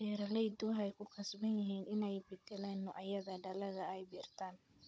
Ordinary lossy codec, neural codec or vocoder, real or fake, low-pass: none; codec, 16 kHz, 16 kbps, FunCodec, trained on Chinese and English, 50 frames a second; fake; none